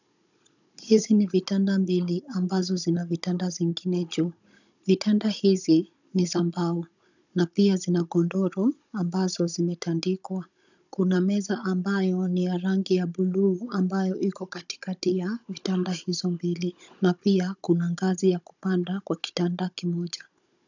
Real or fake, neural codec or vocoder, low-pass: fake; codec, 16 kHz, 16 kbps, FunCodec, trained on Chinese and English, 50 frames a second; 7.2 kHz